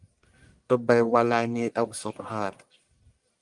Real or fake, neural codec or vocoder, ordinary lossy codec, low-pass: fake; codec, 44.1 kHz, 1.7 kbps, Pupu-Codec; Opus, 32 kbps; 10.8 kHz